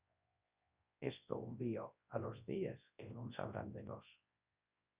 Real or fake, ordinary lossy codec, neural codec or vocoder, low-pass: fake; Opus, 64 kbps; codec, 24 kHz, 0.9 kbps, WavTokenizer, large speech release; 3.6 kHz